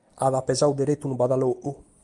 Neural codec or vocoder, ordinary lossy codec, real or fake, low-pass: none; Opus, 32 kbps; real; 10.8 kHz